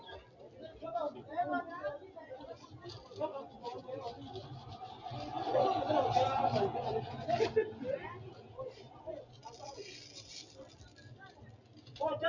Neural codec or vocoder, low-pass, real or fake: none; 7.2 kHz; real